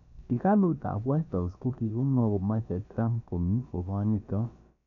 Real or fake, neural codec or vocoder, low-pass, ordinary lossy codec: fake; codec, 16 kHz, about 1 kbps, DyCAST, with the encoder's durations; 7.2 kHz; none